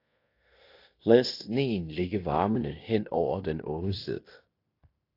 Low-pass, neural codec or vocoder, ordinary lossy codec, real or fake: 5.4 kHz; codec, 16 kHz in and 24 kHz out, 0.9 kbps, LongCat-Audio-Codec, fine tuned four codebook decoder; AAC, 32 kbps; fake